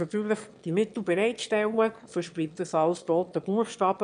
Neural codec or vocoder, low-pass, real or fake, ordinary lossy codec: autoencoder, 22.05 kHz, a latent of 192 numbers a frame, VITS, trained on one speaker; 9.9 kHz; fake; none